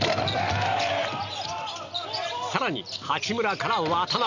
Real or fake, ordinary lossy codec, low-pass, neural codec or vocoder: real; none; 7.2 kHz; none